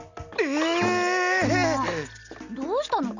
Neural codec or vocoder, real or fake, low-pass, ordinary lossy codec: none; real; 7.2 kHz; none